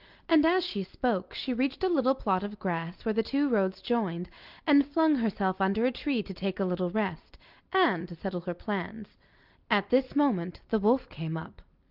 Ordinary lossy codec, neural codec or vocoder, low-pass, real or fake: Opus, 32 kbps; none; 5.4 kHz; real